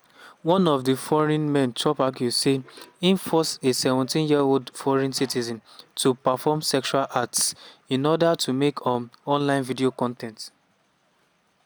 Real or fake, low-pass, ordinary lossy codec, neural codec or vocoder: real; none; none; none